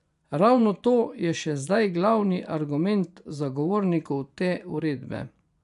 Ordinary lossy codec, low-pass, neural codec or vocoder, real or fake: none; 10.8 kHz; vocoder, 24 kHz, 100 mel bands, Vocos; fake